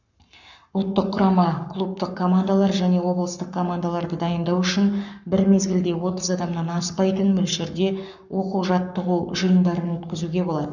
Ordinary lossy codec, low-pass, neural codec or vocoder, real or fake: none; 7.2 kHz; codec, 44.1 kHz, 7.8 kbps, Pupu-Codec; fake